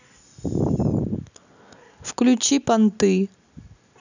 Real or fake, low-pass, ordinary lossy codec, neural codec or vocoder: real; 7.2 kHz; none; none